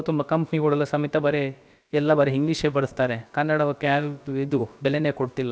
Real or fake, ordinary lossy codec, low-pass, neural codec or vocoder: fake; none; none; codec, 16 kHz, about 1 kbps, DyCAST, with the encoder's durations